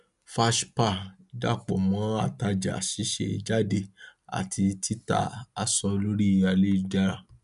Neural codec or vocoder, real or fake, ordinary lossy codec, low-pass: none; real; none; 10.8 kHz